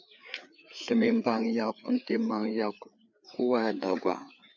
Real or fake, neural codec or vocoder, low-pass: fake; codec, 16 kHz, 4 kbps, FreqCodec, larger model; 7.2 kHz